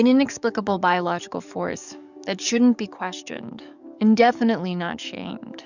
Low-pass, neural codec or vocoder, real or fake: 7.2 kHz; codec, 44.1 kHz, 7.8 kbps, DAC; fake